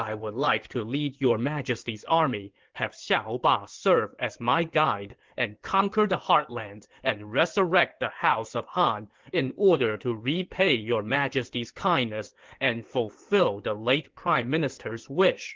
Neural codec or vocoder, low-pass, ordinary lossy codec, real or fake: codec, 16 kHz in and 24 kHz out, 2.2 kbps, FireRedTTS-2 codec; 7.2 kHz; Opus, 16 kbps; fake